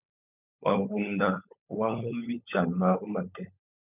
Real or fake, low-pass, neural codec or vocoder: fake; 3.6 kHz; codec, 16 kHz, 16 kbps, FunCodec, trained on LibriTTS, 50 frames a second